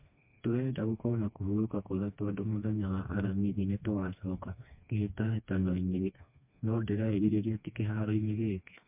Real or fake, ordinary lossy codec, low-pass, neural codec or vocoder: fake; MP3, 32 kbps; 3.6 kHz; codec, 16 kHz, 2 kbps, FreqCodec, smaller model